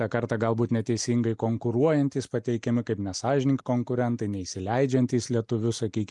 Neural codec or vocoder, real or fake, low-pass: none; real; 10.8 kHz